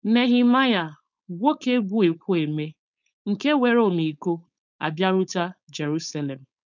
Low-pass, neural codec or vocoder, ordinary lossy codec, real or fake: 7.2 kHz; codec, 16 kHz, 4.8 kbps, FACodec; none; fake